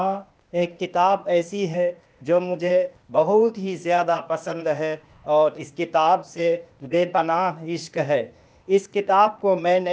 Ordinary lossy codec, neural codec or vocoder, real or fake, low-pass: none; codec, 16 kHz, 0.8 kbps, ZipCodec; fake; none